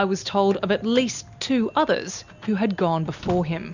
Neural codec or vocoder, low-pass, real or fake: none; 7.2 kHz; real